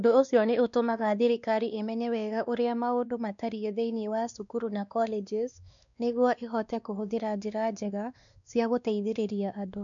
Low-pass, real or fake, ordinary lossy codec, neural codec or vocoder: 7.2 kHz; fake; none; codec, 16 kHz, 2 kbps, X-Codec, WavLM features, trained on Multilingual LibriSpeech